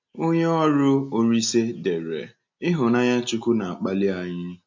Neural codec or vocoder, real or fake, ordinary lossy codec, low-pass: none; real; MP3, 48 kbps; 7.2 kHz